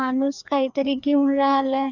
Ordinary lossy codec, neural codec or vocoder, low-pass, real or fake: none; codec, 16 kHz, 2 kbps, FreqCodec, larger model; 7.2 kHz; fake